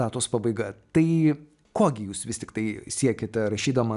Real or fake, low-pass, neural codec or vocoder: real; 10.8 kHz; none